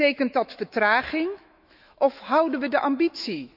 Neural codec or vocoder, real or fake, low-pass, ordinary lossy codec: autoencoder, 48 kHz, 128 numbers a frame, DAC-VAE, trained on Japanese speech; fake; 5.4 kHz; none